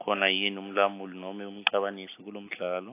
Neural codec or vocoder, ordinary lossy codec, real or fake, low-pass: none; AAC, 24 kbps; real; 3.6 kHz